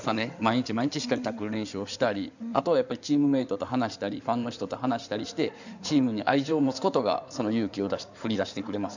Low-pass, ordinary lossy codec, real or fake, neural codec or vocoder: 7.2 kHz; none; fake; codec, 16 kHz in and 24 kHz out, 2.2 kbps, FireRedTTS-2 codec